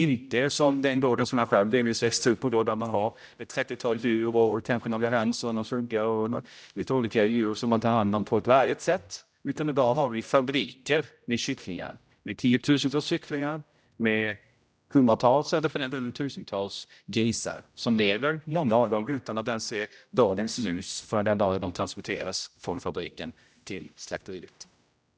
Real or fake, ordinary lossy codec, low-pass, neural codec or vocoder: fake; none; none; codec, 16 kHz, 0.5 kbps, X-Codec, HuBERT features, trained on general audio